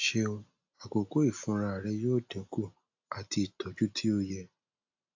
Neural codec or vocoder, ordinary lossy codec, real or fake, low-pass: none; AAC, 48 kbps; real; 7.2 kHz